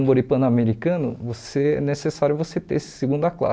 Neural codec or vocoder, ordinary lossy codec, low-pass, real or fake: none; none; none; real